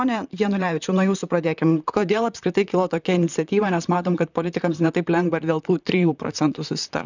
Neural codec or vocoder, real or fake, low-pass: vocoder, 44.1 kHz, 128 mel bands, Pupu-Vocoder; fake; 7.2 kHz